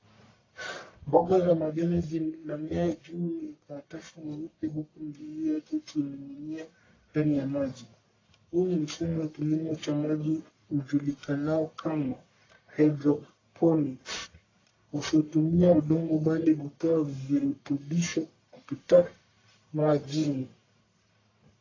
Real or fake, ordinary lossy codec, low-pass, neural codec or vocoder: fake; AAC, 32 kbps; 7.2 kHz; codec, 44.1 kHz, 1.7 kbps, Pupu-Codec